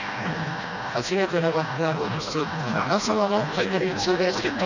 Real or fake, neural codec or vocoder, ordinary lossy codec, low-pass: fake; codec, 16 kHz, 1 kbps, FreqCodec, smaller model; none; 7.2 kHz